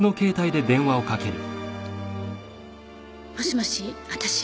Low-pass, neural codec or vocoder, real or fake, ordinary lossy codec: none; none; real; none